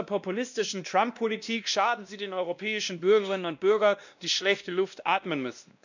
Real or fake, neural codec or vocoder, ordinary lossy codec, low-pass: fake; codec, 16 kHz, 1 kbps, X-Codec, WavLM features, trained on Multilingual LibriSpeech; none; 7.2 kHz